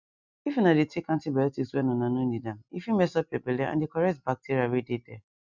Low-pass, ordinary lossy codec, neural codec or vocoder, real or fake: 7.2 kHz; none; none; real